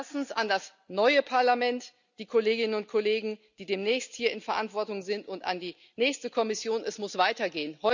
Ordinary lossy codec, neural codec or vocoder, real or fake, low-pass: none; none; real; 7.2 kHz